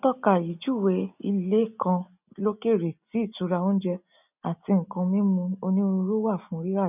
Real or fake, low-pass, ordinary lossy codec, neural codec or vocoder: real; 3.6 kHz; none; none